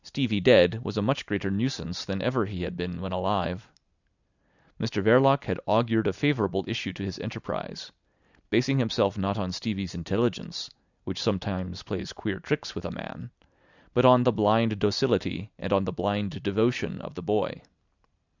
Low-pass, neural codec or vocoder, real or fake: 7.2 kHz; none; real